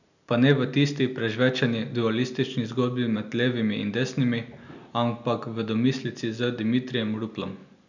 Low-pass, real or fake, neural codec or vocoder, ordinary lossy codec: 7.2 kHz; real; none; none